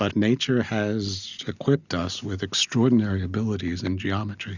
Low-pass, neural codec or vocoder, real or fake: 7.2 kHz; none; real